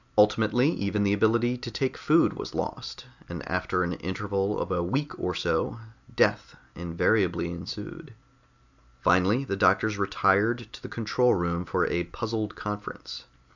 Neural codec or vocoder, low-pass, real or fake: none; 7.2 kHz; real